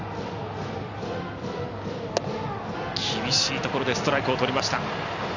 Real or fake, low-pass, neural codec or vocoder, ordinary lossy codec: real; 7.2 kHz; none; none